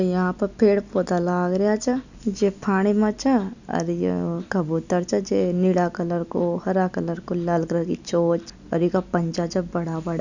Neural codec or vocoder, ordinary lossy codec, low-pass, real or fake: none; none; 7.2 kHz; real